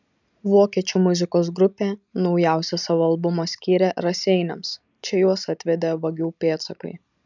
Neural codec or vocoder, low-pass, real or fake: none; 7.2 kHz; real